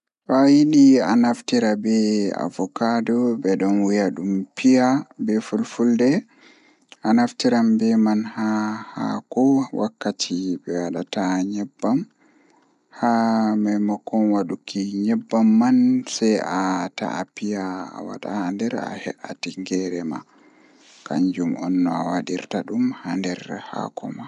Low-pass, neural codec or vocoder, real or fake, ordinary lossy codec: 10.8 kHz; none; real; none